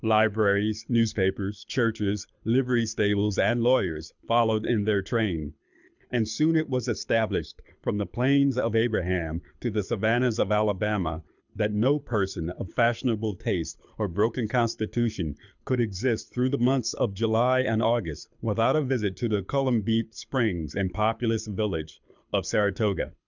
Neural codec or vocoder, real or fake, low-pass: codec, 24 kHz, 6 kbps, HILCodec; fake; 7.2 kHz